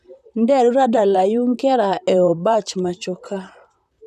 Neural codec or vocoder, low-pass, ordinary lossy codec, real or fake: vocoder, 44.1 kHz, 128 mel bands, Pupu-Vocoder; 14.4 kHz; none; fake